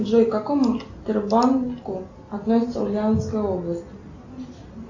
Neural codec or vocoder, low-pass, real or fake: none; 7.2 kHz; real